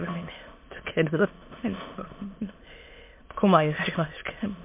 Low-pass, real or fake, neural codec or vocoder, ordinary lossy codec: 3.6 kHz; fake; autoencoder, 22.05 kHz, a latent of 192 numbers a frame, VITS, trained on many speakers; MP3, 24 kbps